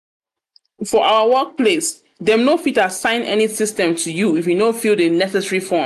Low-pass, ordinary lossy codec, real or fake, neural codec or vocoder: 14.4 kHz; none; real; none